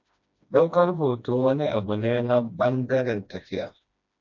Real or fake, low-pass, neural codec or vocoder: fake; 7.2 kHz; codec, 16 kHz, 1 kbps, FreqCodec, smaller model